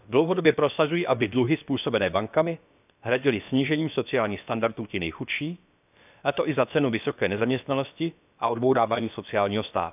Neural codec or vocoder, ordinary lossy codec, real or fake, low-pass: codec, 16 kHz, about 1 kbps, DyCAST, with the encoder's durations; none; fake; 3.6 kHz